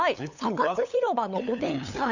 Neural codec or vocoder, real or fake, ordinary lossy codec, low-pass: codec, 16 kHz, 8 kbps, FunCodec, trained on LibriTTS, 25 frames a second; fake; none; 7.2 kHz